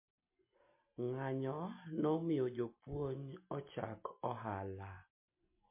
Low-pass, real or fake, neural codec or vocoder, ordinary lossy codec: 3.6 kHz; real; none; MP3, 24 kbps